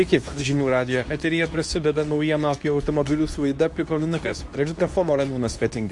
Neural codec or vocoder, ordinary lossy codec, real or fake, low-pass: codec, 24 kHz, 0.9 kbps, WavTokenizer, medium speech release version 2; AAC, 64 kbps; fake; 10.8 kHz